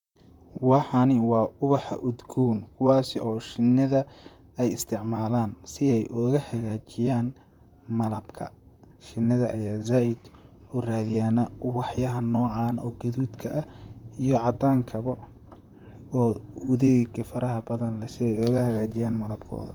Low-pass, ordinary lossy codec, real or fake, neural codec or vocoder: 19.8 kHz; none; fake; vocoder, 44.1 kHz, 128 mel bands, Pupu-Vocoder